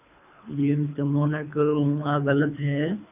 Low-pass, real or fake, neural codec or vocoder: 3.6 kHz; fake; codec, 24 kHz, 3 kbps, HILCodec